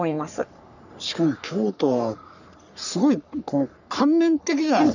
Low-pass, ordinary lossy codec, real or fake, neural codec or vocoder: 7.2 kHz; none; fake; codec, 44.1 kHz, 3.4 kbps, Pupu-Codec